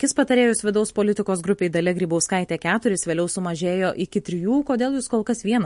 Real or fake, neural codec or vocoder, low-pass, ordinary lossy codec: real; none; 10.8 kHz; MP3, 48 kbps